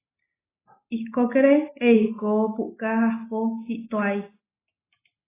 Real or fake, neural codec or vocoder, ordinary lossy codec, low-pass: real; none; AAC, 16 kbps; 3.6 kHz